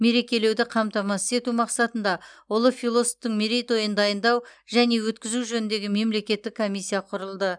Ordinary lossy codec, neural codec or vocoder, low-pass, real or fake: none; none; 9.9 kHz; real